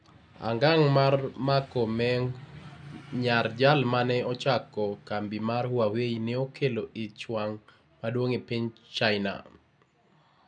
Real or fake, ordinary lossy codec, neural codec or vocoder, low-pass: real; none; none; 9.9 kHz